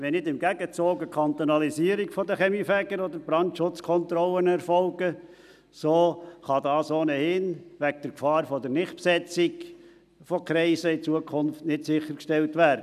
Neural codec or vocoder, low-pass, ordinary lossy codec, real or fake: none; 14.4 kHz; none; real